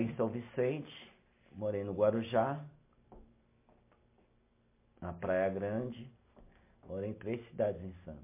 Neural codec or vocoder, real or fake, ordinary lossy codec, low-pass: none; real; MP3, 24 kbps; 3.6 kHz